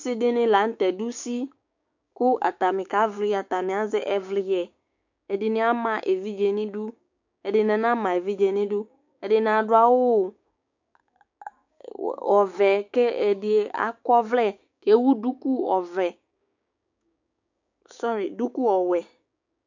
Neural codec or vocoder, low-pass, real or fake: codec, 16 kHz, 6 kbps, DAC; 7.2 kHz; fake